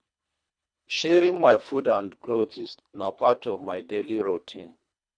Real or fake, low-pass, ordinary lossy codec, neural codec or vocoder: fake; 9.9 kHz; none; codec, 24 kHz, 1.5 kbps, HILCodec